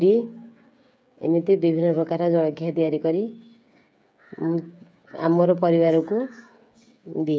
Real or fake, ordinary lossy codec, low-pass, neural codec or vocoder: fake; none; none; codec, 16 kHz, 8 kbps, FreqCodec, smaller model